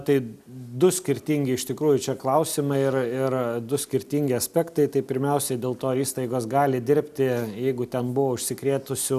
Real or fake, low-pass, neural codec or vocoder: real; 14.4 kHz; none